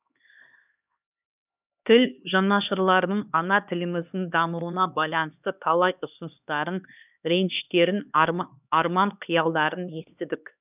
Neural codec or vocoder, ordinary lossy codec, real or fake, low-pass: codec, 16 kHz, 2 kbps, X-Codec, HuBERT features, trained on LibriSpeech; none; fake; 3.6 kHz